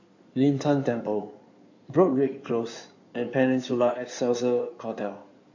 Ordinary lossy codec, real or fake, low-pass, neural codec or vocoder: AAC, 48 kbps; fake; 7.2 kHz; codec, 16 kHz in and 24 kHz out, 2.2 kbps, FireRedTTS-2 codec